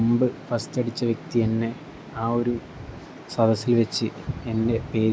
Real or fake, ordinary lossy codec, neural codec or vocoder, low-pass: real; none; none; none